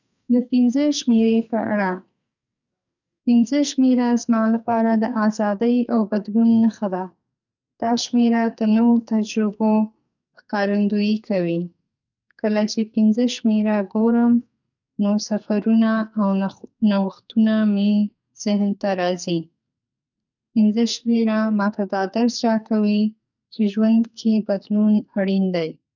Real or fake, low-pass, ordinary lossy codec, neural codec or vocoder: fake; 7.2 kHz; none; codec, 44.1 kHz, 2.6 kbps, SNAC